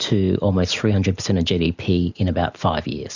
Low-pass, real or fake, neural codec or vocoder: 7.2 kHz; real; none